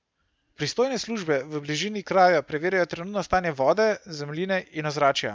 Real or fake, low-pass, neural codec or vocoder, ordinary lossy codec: real; none; none; none